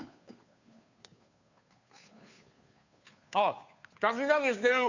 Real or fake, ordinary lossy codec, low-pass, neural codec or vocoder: fake; none; 7.2 kHz; codec, 16 kHz, 2 kbps, FunCodec, trained on Chinese and English, 25 frames a second